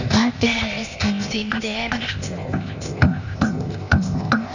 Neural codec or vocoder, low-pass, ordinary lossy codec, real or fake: codec, 16 kHz, 0.8 kbps, ZipCodec; 7.2 kHz; none; fake